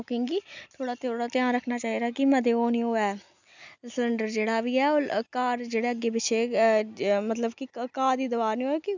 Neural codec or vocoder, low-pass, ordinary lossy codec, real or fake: none; 7.2 kHz; none; real